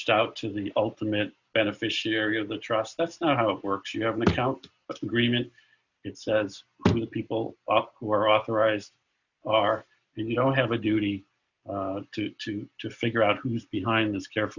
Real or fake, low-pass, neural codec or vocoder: real; 7.2 kHz; none